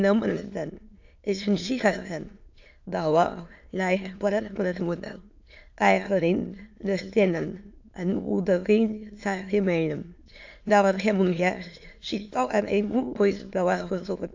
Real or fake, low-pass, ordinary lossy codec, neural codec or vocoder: fake; 7.2 kHz; AAC, 48 kbps; autoencoder, 22.05 kHz, a latent of 192 numbers a frame, VITS, trained on many speakers